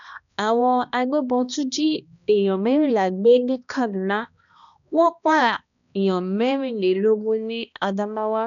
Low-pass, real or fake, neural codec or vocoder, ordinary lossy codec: 7.2 kHz; fake; codec, 16 kHz, 1 kbps, X-Codec, HuBERT features, trained on balanced general audio; none